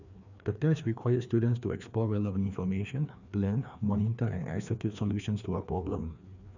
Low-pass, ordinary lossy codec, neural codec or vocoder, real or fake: 7.2 kHz; none; codec, 16 kHz, 2 kbps, FreqCodec, larger model; fake